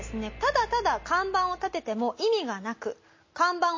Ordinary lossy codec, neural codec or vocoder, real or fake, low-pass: MP3, 32 kbps; none; real; 7.2 kHz